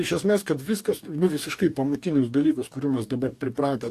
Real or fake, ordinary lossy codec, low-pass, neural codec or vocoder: fake; AAC, 64 kbps; 14.4 kHz; codec, 44.1 kHz, 2.6 kbps, DAC